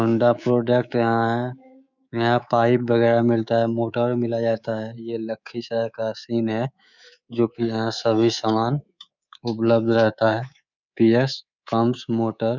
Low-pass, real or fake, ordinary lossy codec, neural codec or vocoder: 7.2 kHz; fake; none; codec, 24 kHz, 3.1 kbps, DualCodec